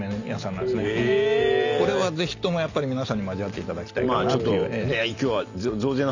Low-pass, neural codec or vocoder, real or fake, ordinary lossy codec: 7.2 kHz; none; real; none